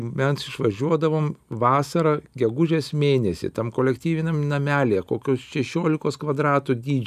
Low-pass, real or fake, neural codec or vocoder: 14.4 kHz; real; none